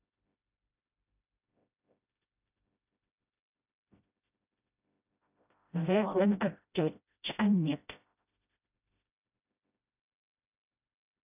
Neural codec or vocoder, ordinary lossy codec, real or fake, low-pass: codec, 16 kHz, 0.5 kbps, FreqCodec, smaller model; none; fake; 3.6 kHz